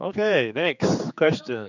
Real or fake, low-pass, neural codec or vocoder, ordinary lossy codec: fake; 7.2 kHz; codec, 16 kHz, 4 kbps, X-Codec, HuBERT features, trained on general audio; none